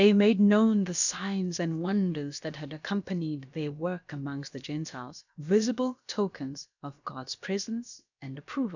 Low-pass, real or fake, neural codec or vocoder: 7.2 kHz; fake; codec, 16 kHz, about 1 kbps, DyCAST, with the encoder's durations